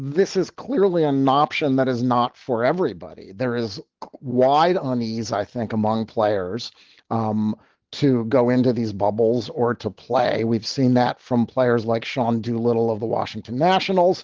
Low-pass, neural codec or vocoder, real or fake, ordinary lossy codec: 7.2 kHz; none; real; Opus, 16 kbps